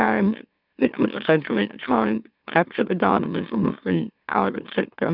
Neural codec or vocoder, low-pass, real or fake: autoencoder, 44.1 kHz, a latent of 192 numbers a frame, MeloTTS; 5.4 kHz; fake